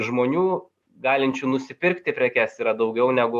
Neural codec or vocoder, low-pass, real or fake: none; 14.4 kHz; real